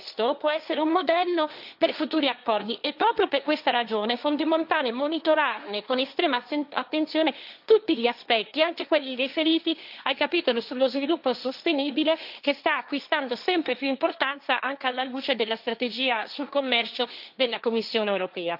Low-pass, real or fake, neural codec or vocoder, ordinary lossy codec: 5.4 kHz; fake; codec, 16 kHz, 1.1 kbps, Voila-Tokenizer; none